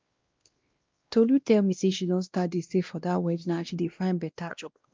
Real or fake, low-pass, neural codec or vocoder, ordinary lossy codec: fake; 7.2 kHz; codec, 16 kHz, 1 kbps, X-Codec, WavLM features, trained on Multilingual LibriSpeech; Opus, 24 kbps